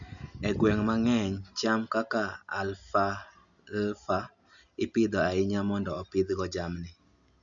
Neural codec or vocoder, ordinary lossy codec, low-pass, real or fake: none; none; 7.2 kHz; real